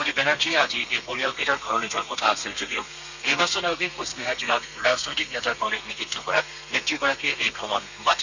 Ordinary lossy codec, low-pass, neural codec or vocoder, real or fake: none; 7.2 kHz; codec, 32 kHz, 1.9 kbps, SNAC; fake